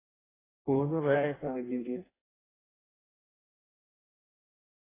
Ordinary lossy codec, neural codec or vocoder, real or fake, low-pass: AAC, 16 kbps; codec, 16 kHz in and 24 kHz out, 0.6 kbps, FireRedTTS-2 codec; fake; 3.6 kHz